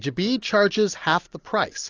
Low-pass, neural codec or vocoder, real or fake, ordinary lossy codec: 7.2 kHz; none; real; AAC, 48 kbps